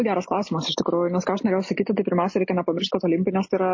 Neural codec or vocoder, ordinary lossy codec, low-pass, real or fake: none; MP3, 32 kbps; 7.2 kHz; real